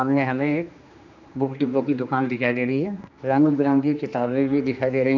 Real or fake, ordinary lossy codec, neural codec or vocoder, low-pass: fake; none; codec, 16 kHz, 2 kbps, X-Codec, HuBERT features, trained on general audio; 7.2 kHz